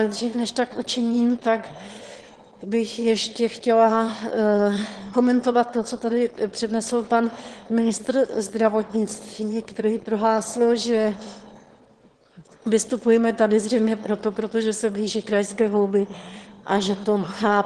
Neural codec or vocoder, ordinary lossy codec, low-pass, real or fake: autoencoder, 22.05 kHz, a latent of 192 numbers a frame, VITS, trained on one speaker; Opus, 16 kbps; 9.9 kHz; fake